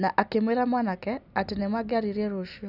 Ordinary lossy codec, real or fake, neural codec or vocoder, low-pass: none; real; none; 5.4 kHz